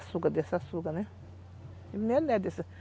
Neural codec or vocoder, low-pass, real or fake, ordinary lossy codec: none; none; real; none